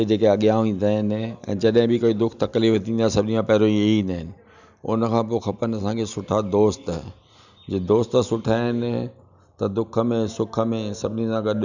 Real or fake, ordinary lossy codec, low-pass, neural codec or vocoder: real; none; 7.2 kHz; none